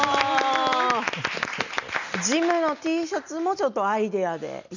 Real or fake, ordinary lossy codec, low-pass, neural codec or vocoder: real; none; 7.2 kHz; none